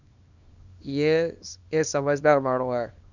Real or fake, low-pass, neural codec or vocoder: fake; 7.2 kHz; codec, 24 kHz, 0.9 kbps, WavTokenizer, small release